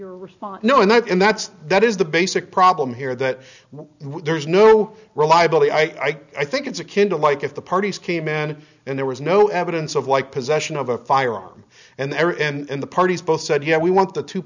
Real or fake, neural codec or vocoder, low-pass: real; none; 7.2 kHz